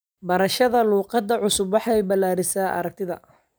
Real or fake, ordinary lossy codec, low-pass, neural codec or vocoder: real; none; none; none